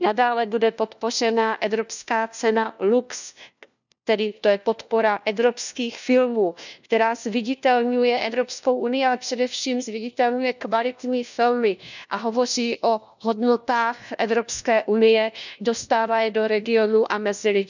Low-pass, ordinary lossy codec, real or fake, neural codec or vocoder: 7.2 kHz; none; fake; codec, 16 kHz, 1 kbps, FunCodec, trained on LibriTTS, 50 frames a second